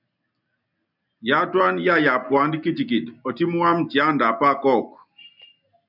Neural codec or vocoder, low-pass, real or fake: none; 5.4 kHz; real